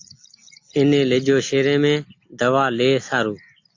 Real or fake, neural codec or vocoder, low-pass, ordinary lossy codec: real; none; 7.2 kHz; AAC, 48 kbps